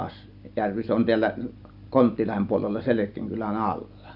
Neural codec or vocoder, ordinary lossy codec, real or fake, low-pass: none; Opus, 64 kbps; real; 5.4 kHz